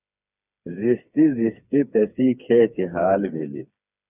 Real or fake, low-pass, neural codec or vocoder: fake; 3.6 kHz; codec, 16 kHz, 4 kbps, FreqCodec, smaller model